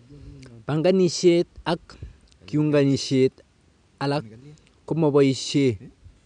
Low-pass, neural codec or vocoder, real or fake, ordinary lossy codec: 9.9 kHz; none; real; none